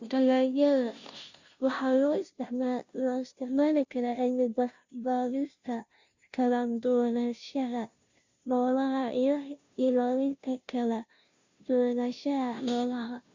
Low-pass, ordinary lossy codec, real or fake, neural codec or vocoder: 7.2 kHz; Opus, 64 kbps; fake; codec, 16 kHz, 0.5 kbps, FunCodec, trained on Chinese and English, 25 frames a second